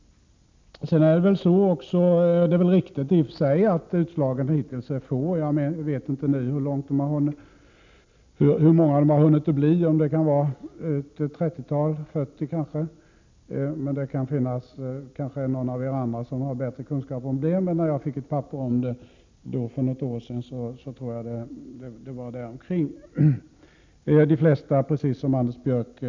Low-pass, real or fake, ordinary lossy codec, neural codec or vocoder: 7.2 kHz; real; MP3, 64 kbps; none